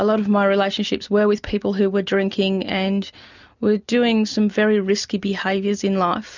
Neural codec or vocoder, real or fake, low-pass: none; real; 7.2 kHz